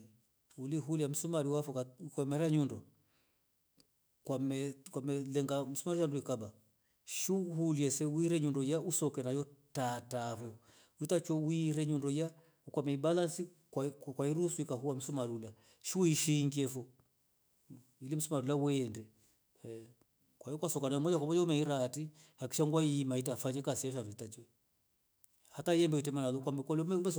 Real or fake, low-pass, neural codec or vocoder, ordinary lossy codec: fake; none; autoencoder, 48 kHz, 128 numbers a frame, DAC-VAE, trained on Japanese speech; none